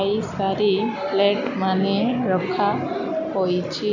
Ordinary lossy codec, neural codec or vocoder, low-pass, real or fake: none; none; 7.2 kHz; real